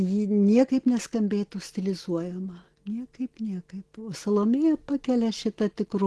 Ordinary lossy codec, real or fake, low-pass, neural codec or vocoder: Opus, 16 kbps; real; 10.8 kHz; none